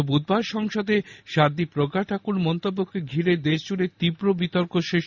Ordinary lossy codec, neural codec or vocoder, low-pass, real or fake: none; none; 7.2 kHz; real